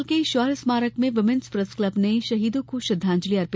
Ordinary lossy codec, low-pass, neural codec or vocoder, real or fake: none; none; none; real